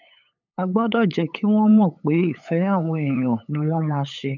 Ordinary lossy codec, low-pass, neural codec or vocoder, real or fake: none; 7.2 kHz; codec, 16 kHz, 8 kbps, FunCodec, trained on LibriTTS, 25 frames a second; fake